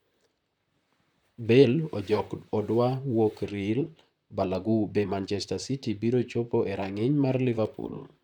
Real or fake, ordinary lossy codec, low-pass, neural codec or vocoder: fake; none; 19.8 kHz; vocoder, 44.1 kHz, 128 mel bands, Pupu-Vocoder